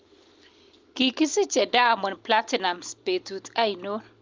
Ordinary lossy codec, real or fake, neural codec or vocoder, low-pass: Opus, 32 kbps; real; none; 7.2 kHz